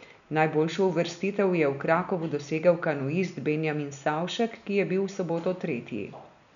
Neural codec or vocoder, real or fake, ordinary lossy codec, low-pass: none; real; none; 7.2 kHz